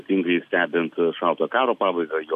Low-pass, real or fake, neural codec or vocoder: 14.4 kHz; real; none